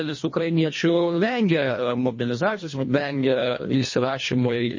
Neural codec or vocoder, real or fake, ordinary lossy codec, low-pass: codec, 24 kHz, 1.5 kbps, HILCodec; fake; MP3, 32 kbps; 7.2 kHz